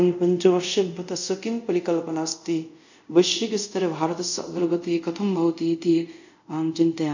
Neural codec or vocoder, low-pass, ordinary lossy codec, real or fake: codec, 24 kHz, 0.5 kbps, DualCodec; 7.2 kHz; none; fake